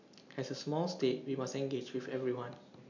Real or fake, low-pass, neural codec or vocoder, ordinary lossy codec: real; 7.2 kHz; none; none